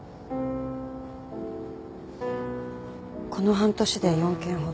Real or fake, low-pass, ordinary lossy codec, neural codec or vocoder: real; none; none; none